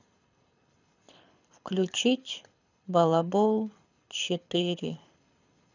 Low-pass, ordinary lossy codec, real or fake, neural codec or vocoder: 7.2 kHz; none; fake; codec, 24 kHz, 6 kbps, HILCodec